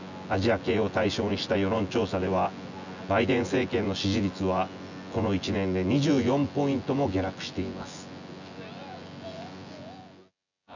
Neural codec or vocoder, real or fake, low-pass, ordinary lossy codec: vocoder, 24 kHz, 100 mel bands, Vocos; fake; 7.2 kHz; none